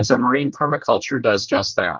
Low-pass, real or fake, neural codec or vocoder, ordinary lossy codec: 7.2 kHz; fake; codec, 24 kHz, 1 kbps, SNAC; Opus, 32 kbps